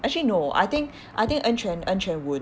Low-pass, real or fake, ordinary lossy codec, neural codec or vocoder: none; real; none; none